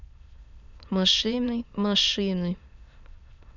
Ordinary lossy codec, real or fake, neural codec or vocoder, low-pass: none; fake; autoencoder, 22.05 kHz, a latent of 192 numbers a frame, VITS, trained on many speakers; 7.2 kHz